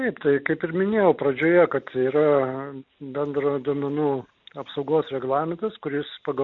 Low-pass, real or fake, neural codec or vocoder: 7.2 kHz; real; none